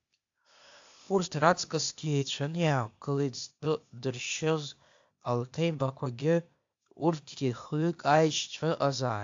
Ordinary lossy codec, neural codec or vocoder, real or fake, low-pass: AAC, 64 kbps; codec, 16 kHz, 0.8 kbps, ZipCodec; fake; 7.2 kHz